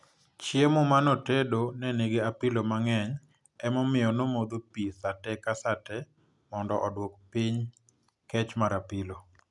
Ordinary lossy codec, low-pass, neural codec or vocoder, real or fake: none; 10.8 kHz; none; real